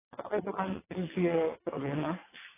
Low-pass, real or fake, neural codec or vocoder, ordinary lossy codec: 3.6 kHz; fake; vocoder, 22.05 kHz, 80 mel bands, WaveNeXt; AAC, 16 kbps